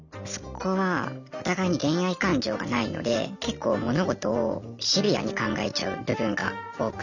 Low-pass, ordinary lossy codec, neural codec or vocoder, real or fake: 7.2 kHz; none; none; real